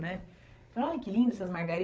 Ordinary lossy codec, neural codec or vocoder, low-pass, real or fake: none; codec, 16 kHz, 16 kbps, FreqCodec, larger model; none; fake